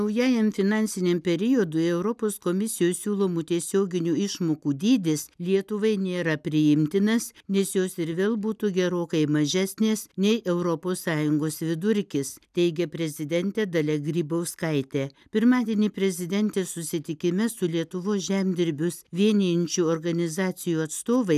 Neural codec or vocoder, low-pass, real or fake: none; 14.4 kHz; real